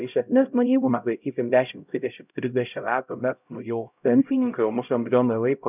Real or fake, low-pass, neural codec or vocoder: fake; 3.6 kHz; codec, 16 kHz, 0.5 kbps, X-Codec, HuBERT features, trained on LibriSpeech